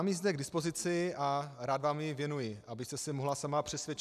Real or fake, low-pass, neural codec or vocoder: real; 14.4 kHz; none